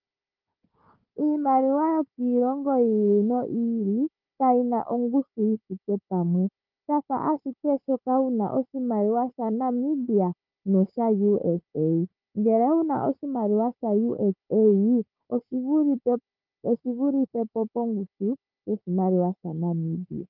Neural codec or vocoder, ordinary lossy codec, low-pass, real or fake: codec, 16 kHz, 4 kbps, FunCodec, trained on Chinese and English, 50 frames a second; Opus, 32 kbps; 5.4 kHz; fake